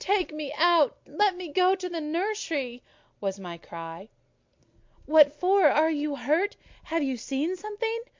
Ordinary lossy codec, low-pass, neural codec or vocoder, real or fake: MP3, 48 kbps; 7.2 kHz; none; real